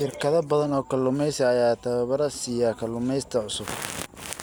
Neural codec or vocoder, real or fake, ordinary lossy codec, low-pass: none; real; none; none